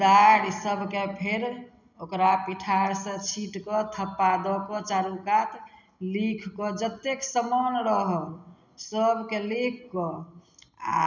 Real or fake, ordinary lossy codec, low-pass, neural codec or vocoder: real; none; 7.2 kHz; none